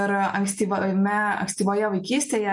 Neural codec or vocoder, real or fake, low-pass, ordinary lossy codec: none; real; 10.8 kHz; MP3, 64 kbps